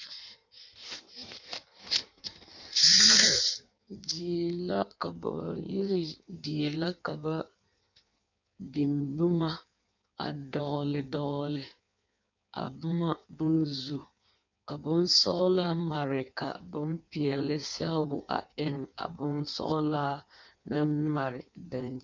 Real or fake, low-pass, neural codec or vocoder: fake; 7.2 kHz; codec, 16 kHz in and 24 kHz out, 1.1 kbps, FireRedTTS-2 codec